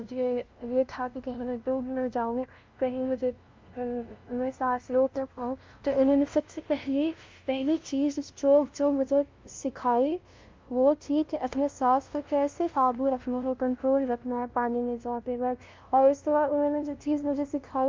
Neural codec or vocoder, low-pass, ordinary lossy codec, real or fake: codec, 16 kHz, 0.5 kbps, FunCodec, trained on LibriTTS, 25 frames a second; 7.2 kHz; Opus, 24 kbps; fake